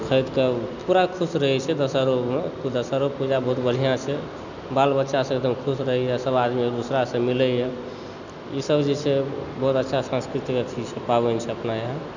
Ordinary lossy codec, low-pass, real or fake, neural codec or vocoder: none; 7.2 kHz; real; none